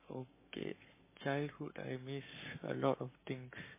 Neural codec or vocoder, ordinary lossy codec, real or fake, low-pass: codec, 44.1 kHz, 7.8 kbps, Pupu-Codec; MP3, 16 kbps; fake; 3.6 kHz